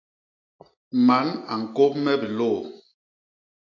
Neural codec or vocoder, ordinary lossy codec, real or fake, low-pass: none; AAC, 48 kbps; real; 7.2 kHz